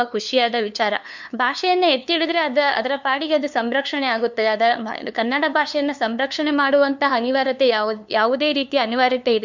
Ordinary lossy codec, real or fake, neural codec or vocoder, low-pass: none; fake; codec, 16 kHz, 2 kbps, FunCodec, trained on LibriTTS, 25 frames a second; 7.2 kHz